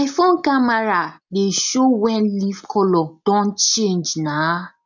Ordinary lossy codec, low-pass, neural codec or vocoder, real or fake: none; 7.2 kHz; none; real